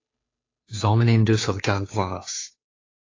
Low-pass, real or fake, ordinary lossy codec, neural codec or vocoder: 7.2 kHz; fake; AAC, 32 kbps; codec, 16 kHz, 2 kbps, FunCodec, trained on Chinese and English, 25 frames a second